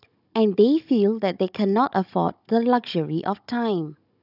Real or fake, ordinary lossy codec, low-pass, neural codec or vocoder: fake; none; 5.4 kHz; codec, 16 kHz, 16 kbps, FunCodec, trained on Chinese and English, 50 frames a second